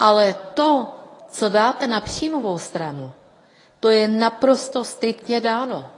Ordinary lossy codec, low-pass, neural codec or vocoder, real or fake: AAC, 32 kbps; 10.8 kHz; codec, 24 kHz, 0.9 kbps, WavTokenizer, medium speech release version 1; fake